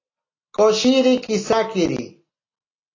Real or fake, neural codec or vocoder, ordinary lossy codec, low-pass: real; none; AAC, 32 kbps; 7.2 kHz